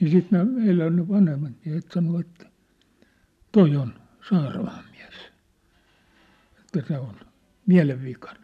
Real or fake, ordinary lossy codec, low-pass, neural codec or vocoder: real; none; 14.4 kHz; none